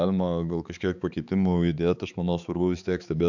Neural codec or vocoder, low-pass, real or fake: codec, 16 kHz, 4 kbps, X-Codec, HuBERT features, trained on balanced general audio; 7.2 kHz; fake